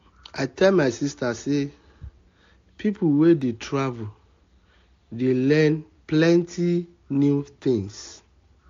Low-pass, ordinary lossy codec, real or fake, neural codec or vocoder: 7.2 kHz; AAC, 48 kbps; real; none